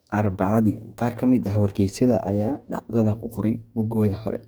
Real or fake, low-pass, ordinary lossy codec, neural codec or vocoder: fake; none; none; codec, 44.1 kHz, 2.6 kbps, DAC